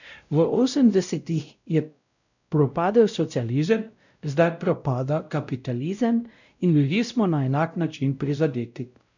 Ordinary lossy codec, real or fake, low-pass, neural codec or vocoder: none; fake; 7.2 kHz; codec, 16 kHz, 0.5 kbps, X-Codec, WavLM features, trained on Multilingual LibriSpeech